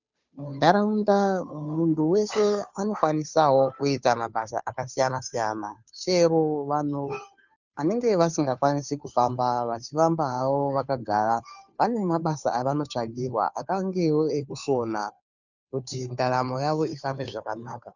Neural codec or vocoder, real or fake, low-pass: codec, 16 kHz, 2 kbps, FunCodec, trained on Chinese and English, 25 frames a second; fake; 7.2 kHz